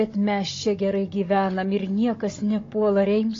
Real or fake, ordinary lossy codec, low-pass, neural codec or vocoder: fake; AAC, 32 kbps; 7.2 kHz; codec, 16 kHz, 16 kbps, FunCodec, trained on Chinese and English, 50 frames a second